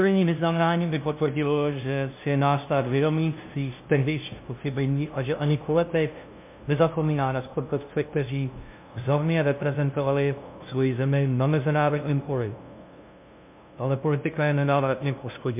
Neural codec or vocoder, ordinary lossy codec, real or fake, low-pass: codec, 16 kHz, 0.5 kbps, FunCodec, trained on LibriTTS, 25 frames a second; MP3, 32 kbps; fake; 3.6 kHz